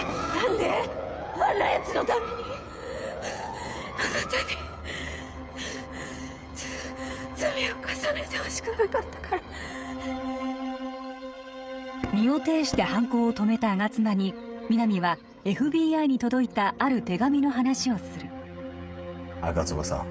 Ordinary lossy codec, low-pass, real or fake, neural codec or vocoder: none; none; fake; codec, 16 kHz, 16 kbps, FreqCodec, smaller model